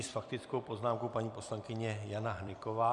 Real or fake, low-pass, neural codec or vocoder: fake; 10.8 kHz; vocoder, 44.1 kHz, 128 mel bands every 256 samples, BigVGAN v2